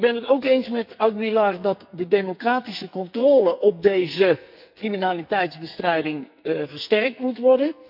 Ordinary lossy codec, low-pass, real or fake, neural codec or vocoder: none; 5.4 kHz; fake; codec, 44.1 kHz, 2.6 kbps, SNAC